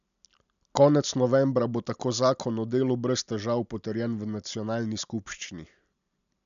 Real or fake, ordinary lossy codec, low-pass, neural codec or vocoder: real; none; 7.2 kHz; none